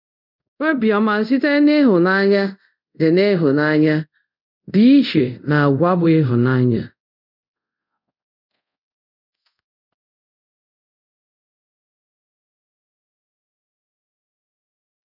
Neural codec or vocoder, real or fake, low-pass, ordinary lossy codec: codec, 24 kHz, 0.5 kbps, DualCodec; fake; 5.4 kHz; none